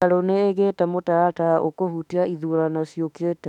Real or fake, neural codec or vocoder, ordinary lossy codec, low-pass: fake; autoencoder, 48 kHz, 32 numbers a frame, DAC-VAE, trained on Japanese speech; none; 19.8 kHz